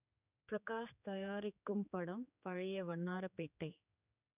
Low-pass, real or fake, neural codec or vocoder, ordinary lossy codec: 3.6 kHz; fake; codec, 16 kHz, 4 kbps, X-Codec, HuBERT features, trained on general audio; none